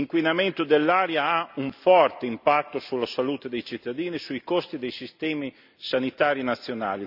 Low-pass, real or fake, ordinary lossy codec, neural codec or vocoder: 5.4 kHz; real; none; none